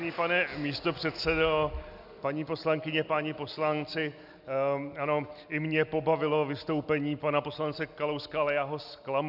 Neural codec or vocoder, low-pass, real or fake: none; 5.4 kHz; real